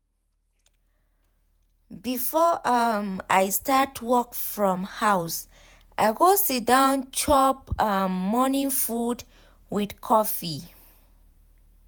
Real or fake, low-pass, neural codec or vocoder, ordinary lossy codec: fake; none; vocoder, 48 kHz, 128 mel bands, Vocos; none